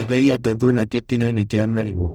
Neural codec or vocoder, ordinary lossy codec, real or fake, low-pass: codec, 44.1 kHz, 0.9 kbps, DAC; none; fake; none